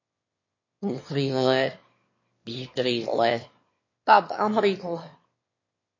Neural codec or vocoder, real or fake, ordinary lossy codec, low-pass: autoencoder, 22.05 kHz, a latent of 192 numbers a frame, VITS, trained on one speaker; fake; MP3, 32 kbps; 7.2 kHz